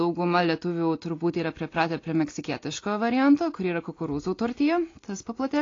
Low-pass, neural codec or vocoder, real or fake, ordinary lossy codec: 7.2 kHz; none; real; AAC, 32 kbps